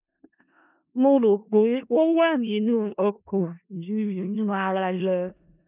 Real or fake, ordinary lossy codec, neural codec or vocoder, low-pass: fake; none; codec, 16 kHz in and 24 kHz out, 0.4 kbps, LongCat-Audio-Codec, four codebook decoder; 3.6 kHz